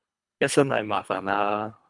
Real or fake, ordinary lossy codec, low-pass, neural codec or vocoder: fake; MP3, 96 kbps; 10.8 kHz; codec, 24 kHz, 3 kbps, HILCodec